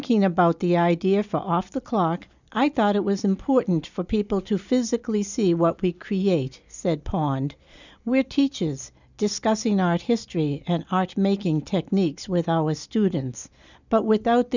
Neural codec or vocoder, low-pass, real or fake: none; 7.2 kHz; real